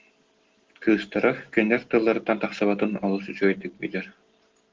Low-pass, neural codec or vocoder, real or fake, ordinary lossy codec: 7.2 kHz; none; real; Opus, 16 kbps